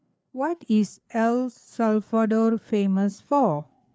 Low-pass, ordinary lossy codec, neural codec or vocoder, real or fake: none; none; codec, 16 kHz, 4 kbps, FreqCodec, larger model; fake